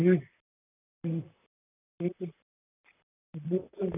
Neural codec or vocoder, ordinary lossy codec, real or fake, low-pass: vocoder, 44.1 kHz, 128 mel bands, Pupu-Vocoder; none; fake; 3.6 kHz